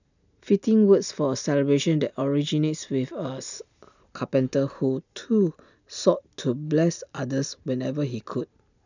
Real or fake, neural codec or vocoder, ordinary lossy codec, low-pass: real; none; none; 7.2 kHz